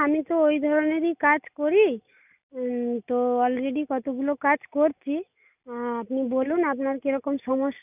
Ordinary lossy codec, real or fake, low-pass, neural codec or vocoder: none; real; 3.6 kHz; none